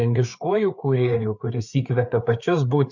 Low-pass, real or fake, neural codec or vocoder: 7.2 kHz; fake; codec, 16 kHz, 4 kbps, FreqCodec, larger model